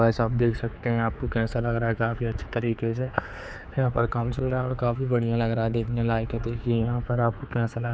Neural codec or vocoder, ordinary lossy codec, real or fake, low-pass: codec, 16 kHz, 4 kbps, X-Codec, HuBERT features, trained on general audio; none; fake; none